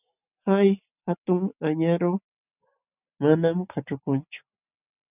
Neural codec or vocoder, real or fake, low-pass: none; real; 3.6 kHz